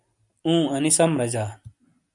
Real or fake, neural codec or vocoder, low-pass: real; none; 10.8 kHz